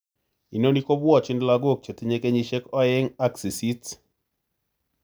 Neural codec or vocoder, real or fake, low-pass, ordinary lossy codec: none; real; none; none